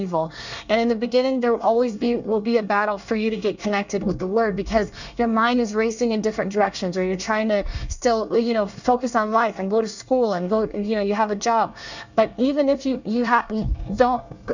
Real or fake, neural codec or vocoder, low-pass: fake; codec, 24 kHz, 1 kbps, SNAC; 7.2 kHz